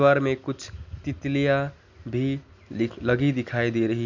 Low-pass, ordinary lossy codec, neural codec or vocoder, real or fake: 7.2 kHz; none; none; real